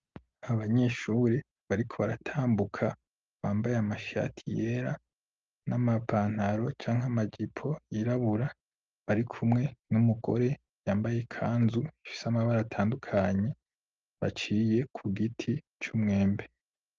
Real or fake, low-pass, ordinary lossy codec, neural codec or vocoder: real; 7.2 kHz; Opus, 24 kbps; none